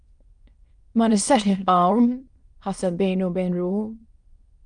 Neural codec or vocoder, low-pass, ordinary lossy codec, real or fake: autoencoder, 22.05 kHz, a latent of 192 numbers a frame, VITS, trained on many speakers; 9.9 kHz; Opus, 32 kbps; fake